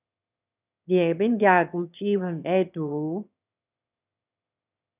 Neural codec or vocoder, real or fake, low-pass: autoencoder, 22.05 kHz, a latent of 192 numbers a frame, VITS, trained on one speaker; fake; 3.6 kHz